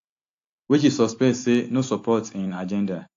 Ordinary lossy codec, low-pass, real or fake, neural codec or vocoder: AAC, 48 kbps; 7.2 kHz; real; none